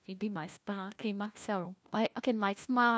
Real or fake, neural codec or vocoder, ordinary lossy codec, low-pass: fake; codec, 16 kHz, 1 kbps, FunCodec, trained on LibriTTS, 50 frames a second; none; none